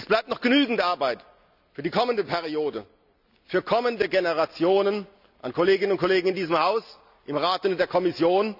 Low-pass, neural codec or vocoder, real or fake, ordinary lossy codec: 5.4 kHz; none; real; none